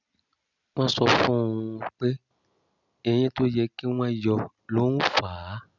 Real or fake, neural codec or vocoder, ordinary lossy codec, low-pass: real; none; none; 7.2 kHz